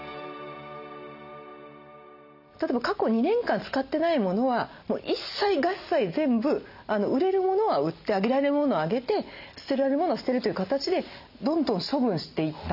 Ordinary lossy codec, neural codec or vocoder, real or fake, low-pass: none; none; real; 5.4 kHz